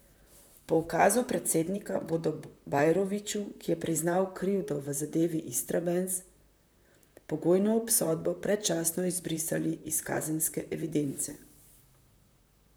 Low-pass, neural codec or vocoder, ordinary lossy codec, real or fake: none; vocoder, 44.1 kHz, 128 mel bands, Pupu-Vocoder; none; fake